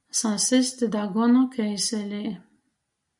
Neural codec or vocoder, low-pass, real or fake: none; 10.8 kHz; real